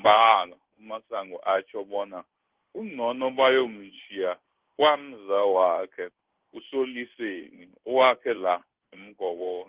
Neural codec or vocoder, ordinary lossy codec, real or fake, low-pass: codec, 16 kHz in and 24 kHz out, 1 kbps, XY-Tokenizer; Opus, 16 kbps; fake; 3.6 kHz